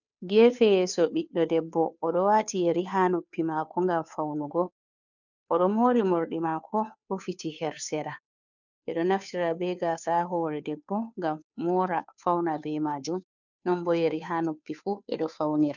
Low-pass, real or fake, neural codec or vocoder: 7.2 kHz; fake; codec, 16 kHz, 2 kbps, FunCodec, trained on Chinese and English, 25 frames a second